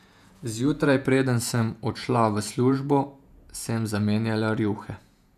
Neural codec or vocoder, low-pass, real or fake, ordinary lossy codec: vocoder, 48 kHz, 128 mel bands, Vocos; 14.4 kHz; fake; none